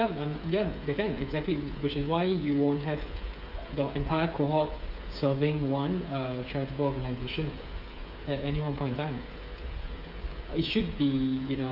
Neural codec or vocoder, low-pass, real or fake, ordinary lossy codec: codec, 16 kHz, 8 kbps, FreqCodec, smaller model; 5.4 kHz; fake; none